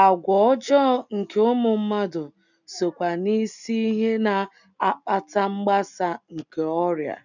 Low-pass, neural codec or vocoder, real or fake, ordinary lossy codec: 7.2 kHz; none; real; none